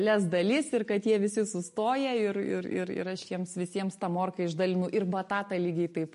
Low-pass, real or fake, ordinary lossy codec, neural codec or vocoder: 14.4 kHz; real; MP3, 48 kbps; none